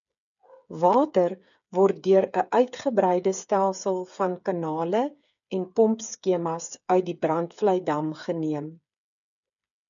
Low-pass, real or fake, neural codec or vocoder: 7.2 kHz; fake; codec, 16 kHz, 8 kbps, FreqCodec, smaller model